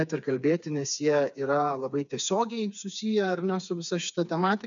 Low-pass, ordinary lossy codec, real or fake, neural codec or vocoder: 7.2 kHz; MP3, 96 kbps; fake; codec, 16 kHz, 4 kbps, FreqCodec, smaller model